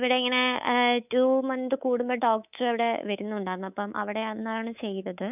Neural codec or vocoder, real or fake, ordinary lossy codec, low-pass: codec, 24 kHz, 3.1 kbps, DualCodec; fake; none; 3.6 kHz